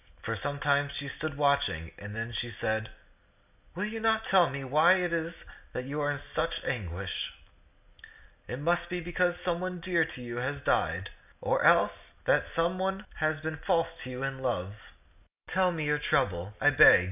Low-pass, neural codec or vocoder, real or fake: 3.6 kHz; none; real